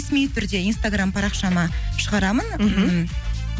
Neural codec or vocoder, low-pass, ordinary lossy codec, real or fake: none; none; none; real